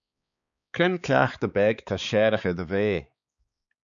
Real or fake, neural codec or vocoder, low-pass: fake; codec, 16 kHz, 4 kbps, X-Codec, HuBERT features, trained on balanced general audio; 7.2 kHz